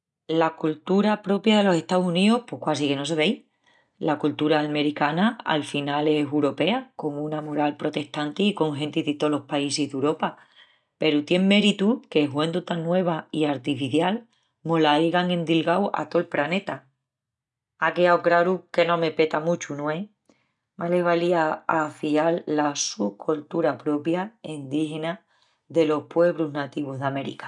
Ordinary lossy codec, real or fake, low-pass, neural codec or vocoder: none; real; 10.8 kHz; none